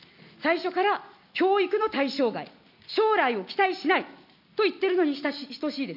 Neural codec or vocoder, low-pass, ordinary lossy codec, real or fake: none; 5.4 kHz; none; real